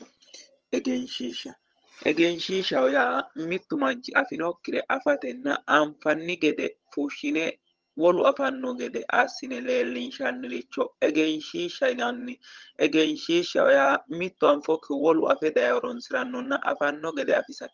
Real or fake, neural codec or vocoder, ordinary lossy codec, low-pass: fake; vocoder, 22.05 kHz, 80 mel bands, HiFi-GAN; Opus, 24 kbps; 7.2 kHz